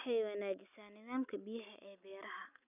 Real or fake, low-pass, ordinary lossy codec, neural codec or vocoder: real; 3.6 kHz; none; none